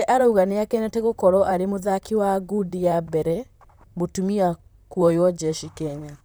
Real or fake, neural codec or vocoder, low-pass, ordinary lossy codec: fake; vocoder, 44.1 kHz, 128 mel bands, Pupu-Vocoder; none; none